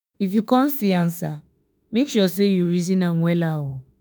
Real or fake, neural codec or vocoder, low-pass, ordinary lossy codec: fake; autoencoder, 48 kHz, 32 numbers a frame, DAC-VAE, trained on Japanese speech; none; none